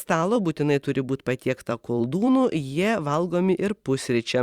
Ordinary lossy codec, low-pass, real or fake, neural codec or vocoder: Opus, 64 kbps; 19.8 kHz; fake; vocoder, 44.1 kHz, 128 mel bands every 512 samples, BigVGAN v2